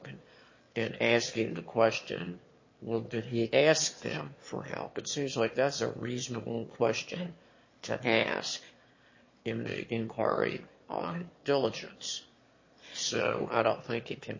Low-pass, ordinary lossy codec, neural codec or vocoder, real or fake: 7.2 kHz; MP3, 32 kbps; autoencoder, 22.05 kHz, a latent of 192 numbers a frame, VITS, trained on one speaker; fake